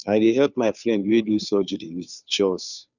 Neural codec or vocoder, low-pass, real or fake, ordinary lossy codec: codec, 16 kHz, 2 kbps, FunCodec, trained on Chinese and English, 25 frames a second; 7.2 kHz; fake; none